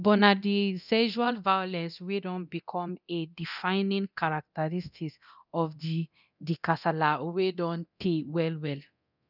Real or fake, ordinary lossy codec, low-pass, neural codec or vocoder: fake; none; 5.4 kHz; codec, 24 kHz, 0.9 kbps, DualCodec